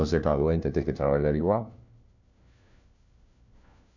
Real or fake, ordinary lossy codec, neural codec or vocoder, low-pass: fake; none; codec, 16 kHz, 1 kbps, FunCodec, trained on LibriTTS, 50 frames a second; 7.2 kHz